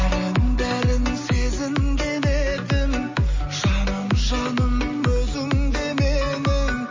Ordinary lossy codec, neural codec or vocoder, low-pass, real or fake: MP3, 32 kbps; none; 7.2 kHz; real